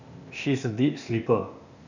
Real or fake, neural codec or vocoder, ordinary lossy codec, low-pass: fake; codec, 16 kHz, 0.8 kbps, ZipCodec; none; 7.2 kHz